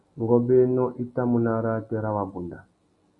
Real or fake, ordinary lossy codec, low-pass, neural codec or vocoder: real; Opus, 64 kbps; 10.8 kHz; none